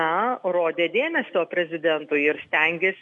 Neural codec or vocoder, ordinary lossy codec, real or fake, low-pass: none; MP3, 64 kbps; real; 10.8 kHz